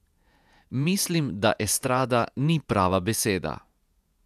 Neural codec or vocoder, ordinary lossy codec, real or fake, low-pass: vocoder, 48 kHz, 128 mel bands, Vocos; none; fake; 14.4 kHz